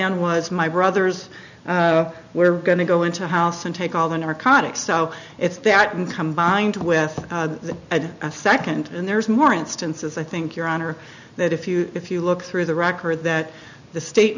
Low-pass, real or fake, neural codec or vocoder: 7.2 kHz; real; none